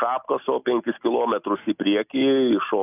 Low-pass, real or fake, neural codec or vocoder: 3.6 kHz; real; none